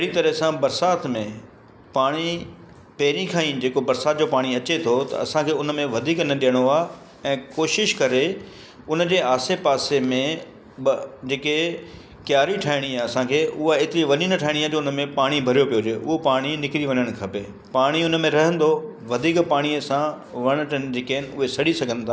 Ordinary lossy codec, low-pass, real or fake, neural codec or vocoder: none; none; real; none